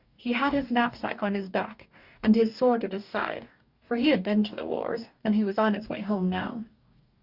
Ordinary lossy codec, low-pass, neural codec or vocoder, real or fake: Opus, 64 kbps; 5.4 kHz; codec, 44.1 kHz, 2.6 kbps, DAC; fake